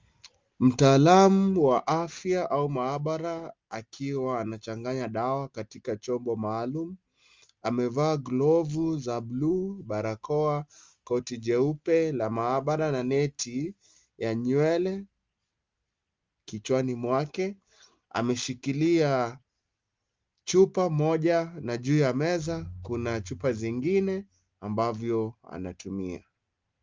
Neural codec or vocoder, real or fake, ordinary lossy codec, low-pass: none; real; Opus, 32 kbps; 7.2 kHz